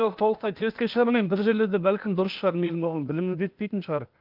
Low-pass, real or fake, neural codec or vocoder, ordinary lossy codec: 5.4 kHz; fake; codec, 16 kHz, 0.8 kbps, ZipCodec; Opus, 24 kbps